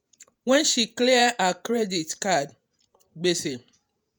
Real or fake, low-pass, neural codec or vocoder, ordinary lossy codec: fake; none; vocoder, 48 kHz, 128 mel bands, Vocos; none